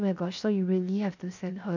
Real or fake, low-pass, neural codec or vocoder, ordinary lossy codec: fake; 7.2 kHz; codec, 16 kHz, 0.7 kbps, FocalCodec; none